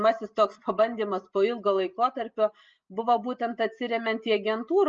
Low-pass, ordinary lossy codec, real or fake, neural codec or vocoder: 7.2 kHz; Opus, 24 kbps; real; none